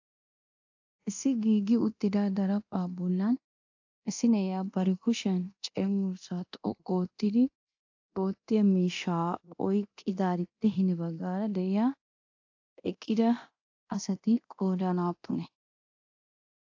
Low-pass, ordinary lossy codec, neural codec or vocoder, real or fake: 7.2 kHz; AAC, 48 kbps; codec, 24 kHz, 0.9 kbps, DualCodec; fake